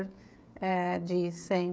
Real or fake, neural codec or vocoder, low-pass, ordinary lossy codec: fake; codec, 16 kHz, 16 kbps, FreqCodec, smaller model; none; none